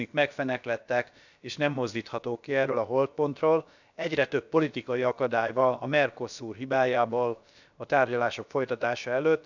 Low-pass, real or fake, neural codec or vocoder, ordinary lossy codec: 7.2 kHz; fake; codec, 16 kHz, about 1 kbps, DyCAST, with the encoder's durations; none